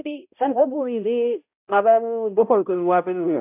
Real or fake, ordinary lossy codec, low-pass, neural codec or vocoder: fake; AAC, 32 kbps; 3.6 kHz; codec, 16 kHz, 0.5 kbps, X-Codec, HuBERT features, trained on balanced general audio